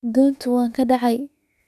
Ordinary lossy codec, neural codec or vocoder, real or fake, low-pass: none; autoencoder, 48 kHz, 32 numbers a frame, DAC-VAE, trained on Japanese speech; fake; 14.4 kHz